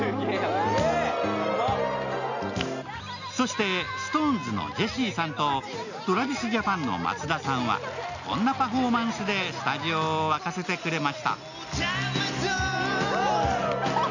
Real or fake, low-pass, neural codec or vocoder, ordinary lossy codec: real; 7.2 kHz; none; none